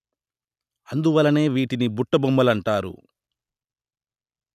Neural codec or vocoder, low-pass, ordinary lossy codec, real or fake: none; 14.4 kHz; none; real